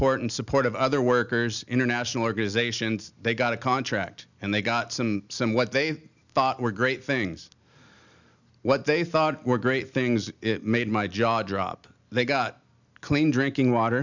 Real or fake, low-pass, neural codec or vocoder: real; 7.2 kHz; none